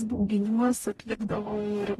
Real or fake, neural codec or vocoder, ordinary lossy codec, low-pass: fake; codec, 44.1 kHz, 0.9 kbps, DAC; AAC, 32 kbps; 19.8 kHz